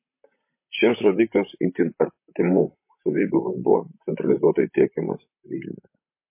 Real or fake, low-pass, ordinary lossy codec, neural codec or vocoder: fake; 3.6 kHz; MP3, 24 kbps; vocoder, 44.1 kHz, 128 mel bands, Pupu-Vocoder